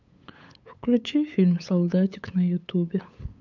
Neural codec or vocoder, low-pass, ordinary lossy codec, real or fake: codec, 16 kHz, 8 kbps, FunCodec, trained on LibriTTS, 25 frames a second; 7.2 kHz; MP3, 64 kbps; fake